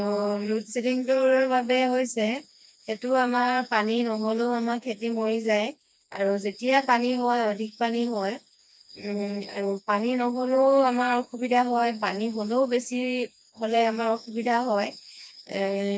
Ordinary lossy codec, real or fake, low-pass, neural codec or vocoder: none; fake; none; codec, 16 kHz, 2 kbps, FreqCodec, smaller model